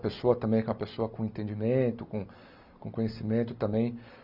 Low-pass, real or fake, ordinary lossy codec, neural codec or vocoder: 5.4 kHz; real; none; none